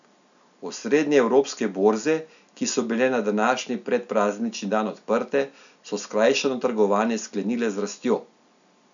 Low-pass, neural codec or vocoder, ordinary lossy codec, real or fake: 7.2 kHz; none; none; real